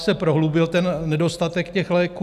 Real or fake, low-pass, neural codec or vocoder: real; 14.4 kHz; none